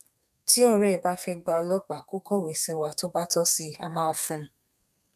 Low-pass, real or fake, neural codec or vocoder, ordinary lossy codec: 14.4 kHz; fake; codec, 32 kHz, 1.9 kbps, SNAC; none